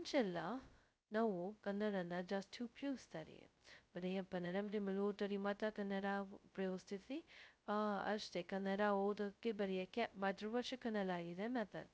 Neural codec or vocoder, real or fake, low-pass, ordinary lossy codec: codec, 16 kHz, 0.2 kbps, FocalCodec; fake; none; none